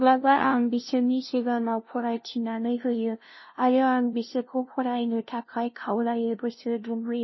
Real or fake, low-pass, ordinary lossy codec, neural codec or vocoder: fake; 7.2 kHz; MP3, 24 kbps; codec, 16 kHz, 0.5 kbps, FunCodec, trained on LibriTTS, 25 frames a second